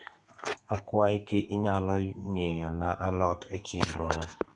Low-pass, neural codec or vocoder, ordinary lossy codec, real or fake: 10.8 kHz; codec, 32 kHz, 1.9 kbps, SNAC; none; fake